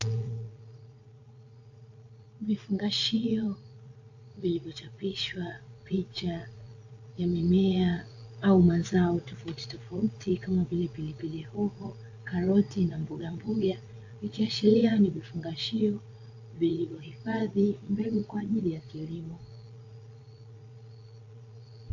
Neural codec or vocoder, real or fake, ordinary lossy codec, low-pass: vocoder, 22.05 kHz, 80 mel bands, Vocos; fake; AAC, 48 kbps; 7.2 kHz